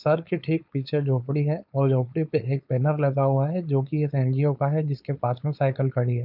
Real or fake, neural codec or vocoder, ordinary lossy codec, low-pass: fake; codec, 16 kHz, 4.8 kbps, FACodec; none; 5.4 kHz